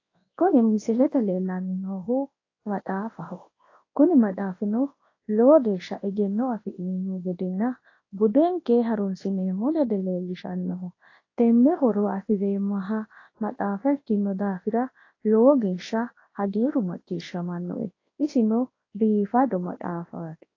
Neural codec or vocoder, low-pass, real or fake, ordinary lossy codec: codec, 24 kHz, 0.9 kbps, WavTokenizer, large speech release; 7.2 kHz; fake; AAC, 32 kbps